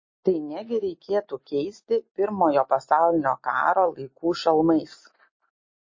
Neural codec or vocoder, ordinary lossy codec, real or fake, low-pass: none; MP3, 32 kbps; real; 7.2 kHz